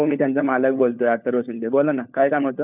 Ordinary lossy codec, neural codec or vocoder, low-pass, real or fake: none; codec, 16 kHz, 4 kbps, FunCodec, trained on LibriTTS, 50 frames a second; 3.6 kHz; fake